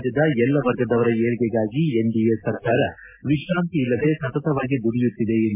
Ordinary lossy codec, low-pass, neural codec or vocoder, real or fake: AAC, 32 kbps; 3.6 kHz; none; real